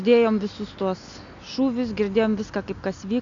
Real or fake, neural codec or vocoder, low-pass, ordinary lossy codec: real; none; 7.2 kHz; AAC, 64 kbps